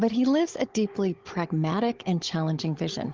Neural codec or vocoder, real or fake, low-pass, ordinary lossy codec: codec, 16 kHz, 16 kbps, FunCodec, trained on Chinese and English, 50 frames a second; fake; 7.2 kHz; Opus, 16 kbps